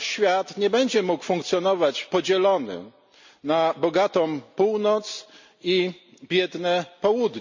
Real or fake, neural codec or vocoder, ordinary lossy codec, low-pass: real; none; MP3, 64 kbps; 7.2 kHz